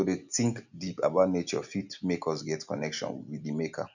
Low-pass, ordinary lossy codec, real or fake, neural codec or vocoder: 7.2 kHz; none; real; none